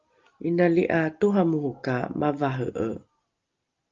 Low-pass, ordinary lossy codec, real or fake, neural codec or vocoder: 7.2 kHz; Opus, 32 kbps; real; none